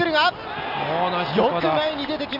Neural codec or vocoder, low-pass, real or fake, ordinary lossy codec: none; 5.4 kHz; real; none